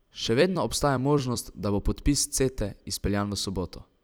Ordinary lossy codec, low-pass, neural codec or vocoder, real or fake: none; none; vocoder, 44.1 kHz, 128 mel bands every 256 samples, BigVGAN v2; fake